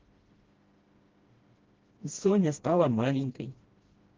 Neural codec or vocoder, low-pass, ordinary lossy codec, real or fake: codec, 16 kHz, 1 kbps, FreqCodec, smaller model; 7.2 kHz; Opus, 16 kbps; fake